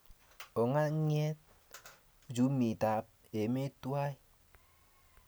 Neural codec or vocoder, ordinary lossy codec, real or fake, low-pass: none; none; real; none